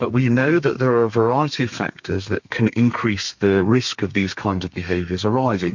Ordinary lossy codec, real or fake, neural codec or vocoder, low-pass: MP3, 48 kbps; fake; codec, 32 kHz, 1.9 kbps, SNAC; 7.2 kHz